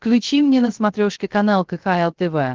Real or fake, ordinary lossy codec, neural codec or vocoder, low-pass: fake; Opus, 16 kbps; codec, 16 kHz, 0.3 kbps, FocalCodec; 7.2 kHz